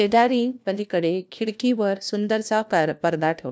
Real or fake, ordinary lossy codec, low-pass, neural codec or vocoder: fake; none; none; codec, 16 kHz, 1 kbps, FunCodec, trained on LibriTTS, 50 frames a second